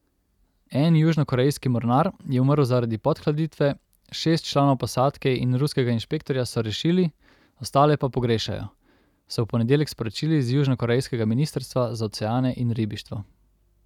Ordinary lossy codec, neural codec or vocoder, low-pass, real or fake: none; none; 19.8 kHz; real